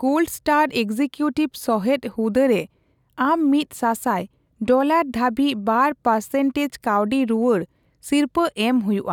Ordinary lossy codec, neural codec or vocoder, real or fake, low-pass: none; none; real; 19.8 kHz